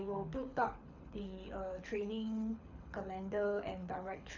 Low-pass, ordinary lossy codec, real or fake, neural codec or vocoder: 7.2 kHz; none; fake; codec, 24 kHz, 6 kbps, HILCodec